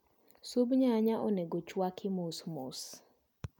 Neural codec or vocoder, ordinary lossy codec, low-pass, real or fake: none; none; 19.8 kHz; real